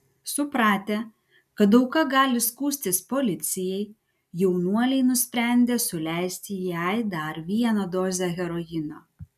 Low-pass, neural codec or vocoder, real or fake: 14.4 kHz; none; real